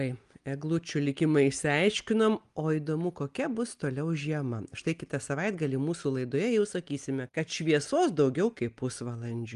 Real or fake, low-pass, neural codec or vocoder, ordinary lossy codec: real; 10.8 kHz; none; Opus, 32 kbps